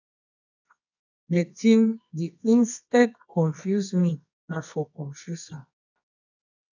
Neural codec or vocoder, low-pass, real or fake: codec, 24 kHz, 0.9 kbps, WavTokenizer, medium music audio release; 7.2 kHz; fake